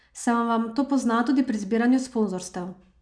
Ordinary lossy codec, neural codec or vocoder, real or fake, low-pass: none; none; real; 9.9 kHz